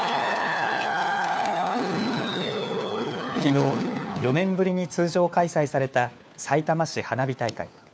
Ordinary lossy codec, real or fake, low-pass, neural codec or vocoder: none; fake; none; codec, 16 kHz, 4 kbps, FunCodec, trained on LibriTTS, 50 frames a second